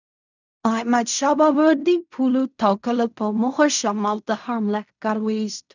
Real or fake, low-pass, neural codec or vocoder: fake; 7.2 kHz; codec, 16 kHz in and 24 kHz out, 0.4 kbps, LongCat-Audio-Codec, fine tuned four codebook decoder